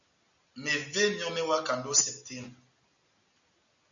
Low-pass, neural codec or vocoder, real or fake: 7.2 kHz; none; real